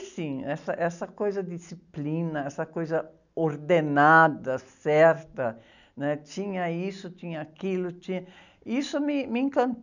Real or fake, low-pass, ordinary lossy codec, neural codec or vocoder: real; 7.2 kHz; none; none